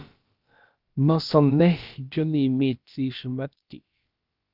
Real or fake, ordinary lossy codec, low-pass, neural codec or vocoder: fake; Opus, 32 kbps; 5.4 kHz; codec, 16 kHz, about 1 kbps, DyCAST, with the encoder's durations